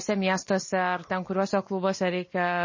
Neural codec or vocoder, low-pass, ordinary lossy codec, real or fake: none; 7.2 kHz; MP3, 32 kbps; real